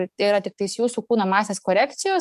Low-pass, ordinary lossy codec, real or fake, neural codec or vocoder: 14.4 kHz; MP3, 96 kbps; fake; codec, 44.1 kHz, 7.8 kbps, DAC